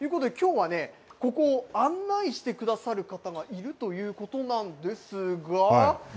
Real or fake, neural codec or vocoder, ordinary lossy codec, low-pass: real; none; none; none